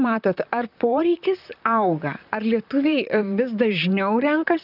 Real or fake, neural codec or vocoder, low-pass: fake; vocoder, 44.1 kHz, 128 mel bands, Pupu-Vocoder; 5.4 kHz